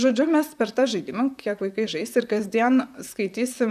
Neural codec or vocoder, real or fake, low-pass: vocoder, 44.1 kHz, 128 mel bands, Pupu-Vocoder; fake; 14.4 kHz